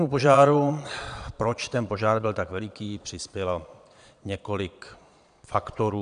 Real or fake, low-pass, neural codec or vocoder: fake; 9.9 kHz; vocoder, 22.05 kHz, 80 mel bands, Vocos